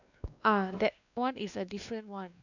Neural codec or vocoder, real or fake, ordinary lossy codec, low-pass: codec, 16 kHz, 2 kbps, X-Codec, WavLM features, trained on Multilingual LibriSpeech; fake; none; 7.2 kHz